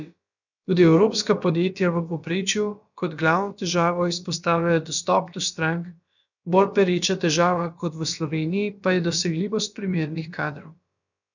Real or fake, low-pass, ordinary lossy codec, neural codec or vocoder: fake; 7.2 kHz; none; codec, 16 kHz, about 1 kbps, DyCAST, with the encoder's durations